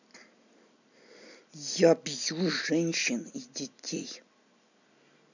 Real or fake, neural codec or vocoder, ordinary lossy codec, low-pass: real; none; none; 7.2 kHz